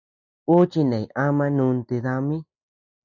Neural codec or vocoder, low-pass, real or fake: none; 7.2 kHz; real